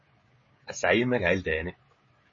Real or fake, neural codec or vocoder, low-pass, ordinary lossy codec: fake; codec, 16 kHz, 16 kbps, FreqCodec, smaller model; 7.2 kHz; MP3, 32 kbps